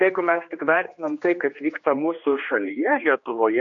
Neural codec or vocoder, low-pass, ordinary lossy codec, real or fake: codec, 16 kHz, 2 kbps, X-Codec, HuBERT features, trained on general audio; 7.2 kHz; MP3, 48 kbps; fake